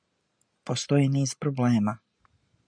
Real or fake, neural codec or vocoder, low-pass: real; none; 9.9 kHz